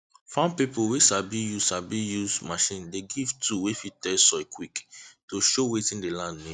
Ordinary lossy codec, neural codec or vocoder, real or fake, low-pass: none; none; real; 9.9 kHz